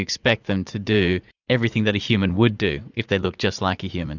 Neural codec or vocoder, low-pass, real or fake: vocoder, 44.1 kHz, 80 mel bands, Vocos; 7.2 kHz; fake